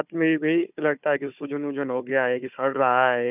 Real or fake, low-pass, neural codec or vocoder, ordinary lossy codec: fake; 3.6 kHz; codec, 16 kHz, 4 kbps, FunCodec, trained on Chinese and English, 50 frames a second; none